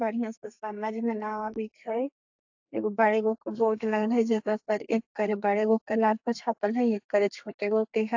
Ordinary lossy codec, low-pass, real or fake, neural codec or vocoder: AAC, 48 kbps; 7.2 kHz; fake; codec, 32 kHz, 1.9 kbps, SNAC